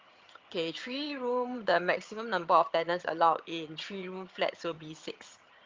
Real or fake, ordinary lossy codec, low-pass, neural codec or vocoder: fake; Opus, 24 kbps; 7.2 kHz; vocoder, 22.05 kHz, 80 mel bands, HiFi-GAN